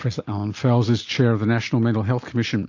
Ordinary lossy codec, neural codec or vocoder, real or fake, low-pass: AAC, 48 kbps; none; real; 7.2 kHz